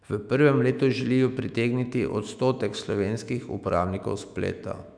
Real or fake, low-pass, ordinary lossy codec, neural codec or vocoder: fake; 9.9 kHz; none; autoencoder, 48 kHz, 128 numbers a frame, DAC-VAE, trained on Japanese speech